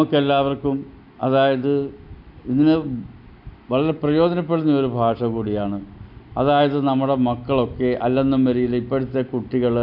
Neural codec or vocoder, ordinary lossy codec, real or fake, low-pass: none; none; real; 5.4 kHz